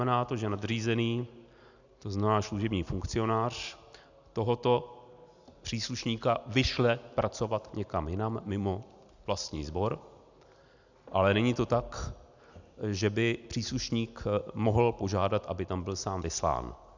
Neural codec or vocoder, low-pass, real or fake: none; 7.2 kHz; real